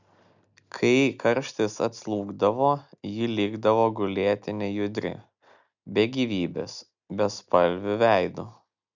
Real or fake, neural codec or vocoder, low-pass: real; none; 7.2 kHz